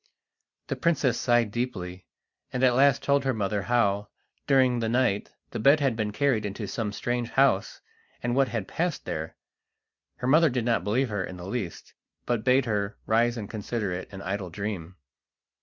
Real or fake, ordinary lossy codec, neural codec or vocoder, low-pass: real; Opus, 64 kbps; none; 7.2 kHz